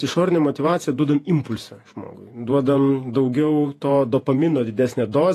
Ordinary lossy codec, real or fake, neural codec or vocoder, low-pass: AAC, 48 kbps; fake; vocoder, 48 kHz, 128 mel bands, Vocos; 14.4 kHz